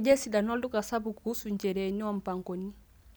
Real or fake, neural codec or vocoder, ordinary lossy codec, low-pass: real; none; none; none